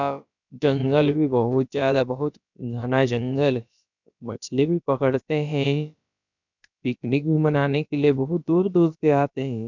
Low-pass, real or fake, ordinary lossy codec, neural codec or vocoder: 7.2 kHz; fake; none; codec, 16 kHz, about 1 kbps, DyCAST, with the encoder's durations